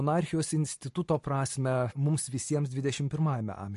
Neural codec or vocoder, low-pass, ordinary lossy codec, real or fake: none; 14.4 kHz; MP3, 48 kbps; real